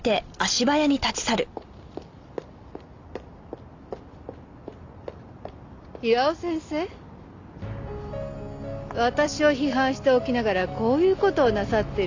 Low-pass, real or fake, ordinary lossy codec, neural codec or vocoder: 7.2 kHz; real; AAC, 48 kbps; none